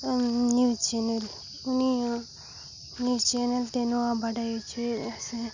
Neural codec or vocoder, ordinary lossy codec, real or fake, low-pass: none; Opus, 64 kbps; real; 7.2 kHz